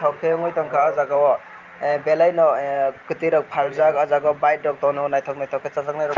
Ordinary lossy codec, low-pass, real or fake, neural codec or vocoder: Opus, 24 kbps; 7.2 kHz; fake; vocoder, 44.1 kHz, 128 mel bands every 512 samples, BigVGAN v2